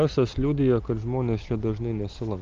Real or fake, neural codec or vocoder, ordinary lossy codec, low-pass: real; none; Opus, 32 kbps; 7.2 kHz